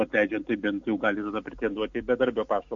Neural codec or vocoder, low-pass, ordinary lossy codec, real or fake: none; 7.2 kHz; MP3, 48 kbps; real